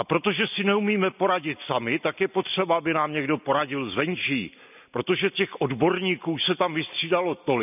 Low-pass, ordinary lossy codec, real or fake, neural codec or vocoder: 3.6 kHz; none; real; none